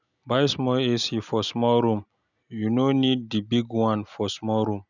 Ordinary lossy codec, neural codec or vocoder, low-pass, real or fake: none; none; 7.2 kHz; real